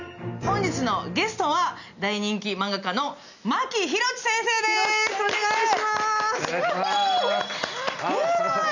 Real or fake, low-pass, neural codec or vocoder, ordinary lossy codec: real; 7.2 kHz; none; none